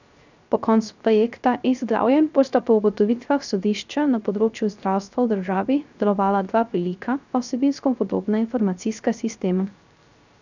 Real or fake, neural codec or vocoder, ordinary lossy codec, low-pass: fake; codec, 16 kHz, 0.3 kbps, FocalCodec; none; 7.2 kHz